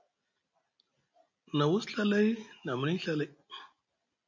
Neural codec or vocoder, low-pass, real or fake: none; 7.2 kHz; real